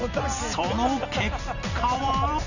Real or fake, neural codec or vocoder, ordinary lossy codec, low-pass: fake; vocoder, 44.1 kHz, 128 mel bands every 256 samples, BigVGAN v2; none; 7.2 kHz